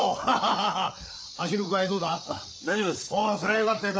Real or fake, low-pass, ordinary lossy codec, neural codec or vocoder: fake; none; none; codec, 16 kHz, 4 kbps, FreqCodec, larger model